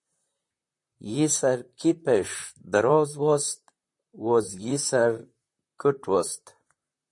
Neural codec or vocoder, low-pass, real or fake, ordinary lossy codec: vocoder, 44.1 kHz, 128 mel bands, Pupu-Vocoder; 10.8 kHz; fake; MP3, 48 kbps